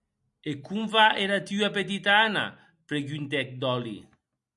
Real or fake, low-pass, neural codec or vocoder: real; 10.8 kHz; none